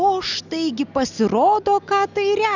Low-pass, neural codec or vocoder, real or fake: 7.2 kHz; none; real